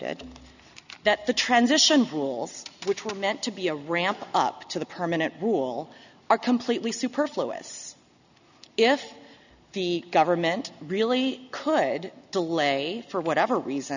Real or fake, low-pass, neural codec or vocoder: real; 7.2 kHz; none